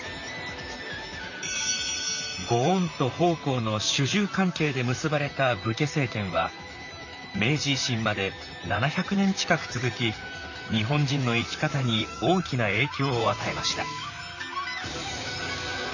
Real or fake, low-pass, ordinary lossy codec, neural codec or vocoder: fake; 7.2 kHz; none; vocoder, 44.1 kHz, 128 mel bands, Pupu-Vocoder